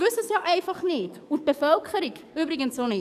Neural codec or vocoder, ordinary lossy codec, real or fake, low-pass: codec, 44.1 kHz, 7.8 kbps, DAC; none; fake; 14.4 kHz